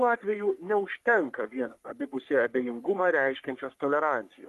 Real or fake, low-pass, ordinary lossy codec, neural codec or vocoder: fake; 14.4 kHz; Opus, 32 kbps; codec, 44.1 kHz, 3.4 kbps, Pupu-Codec